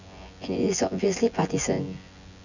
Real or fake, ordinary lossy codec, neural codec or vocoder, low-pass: fake; none; vocoder, 24 kHz, 100 mel bands, Vocos; 7.2 kHz